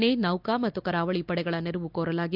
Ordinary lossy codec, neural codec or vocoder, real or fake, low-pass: none; vocoder, 44.1 kHz, 128 mel bands every 256 samples, BigVGAN v2; fake; 5.4 kHz